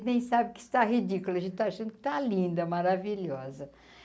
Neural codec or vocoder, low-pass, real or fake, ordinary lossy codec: none; none; real; none